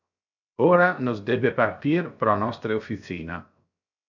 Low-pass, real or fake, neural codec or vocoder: 7.2 kHz; fake; codec, 16 kHz, 0.7 kbps, FocalCodec